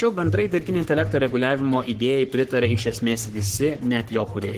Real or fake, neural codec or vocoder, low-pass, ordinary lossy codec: fake; codec, 44.1 kHz, 3.4 kbps, Pupu-Codec; 14.4 kHz; Opus, 16 kbps